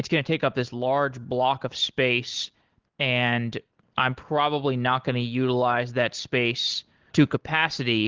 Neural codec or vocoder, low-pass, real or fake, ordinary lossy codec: none; 7.2 kHz; real; Opus, 16 kbps